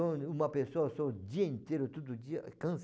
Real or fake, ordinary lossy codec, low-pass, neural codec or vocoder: real; none; none; none